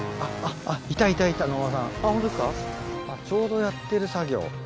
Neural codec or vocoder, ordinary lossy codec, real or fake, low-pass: none; none; real; none